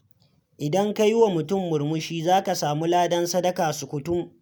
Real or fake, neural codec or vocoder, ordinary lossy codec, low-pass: real; none; none; none